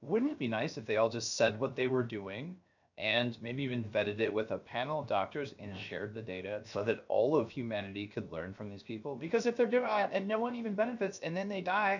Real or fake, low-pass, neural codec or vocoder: fake; 7.2 kHz; codec, 16 kHz, 0.7 kbps, FocalCodec